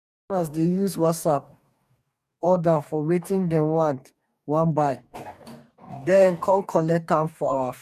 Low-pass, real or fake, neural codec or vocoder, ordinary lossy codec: 14.4 kHz; fake; codec, 44.1 kHz, 2.6 kbps, DAC; none